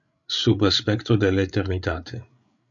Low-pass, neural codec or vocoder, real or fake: 7.2 kHz; codec, 16 kHz, 8 kbps, FreqCodec, larger model; fake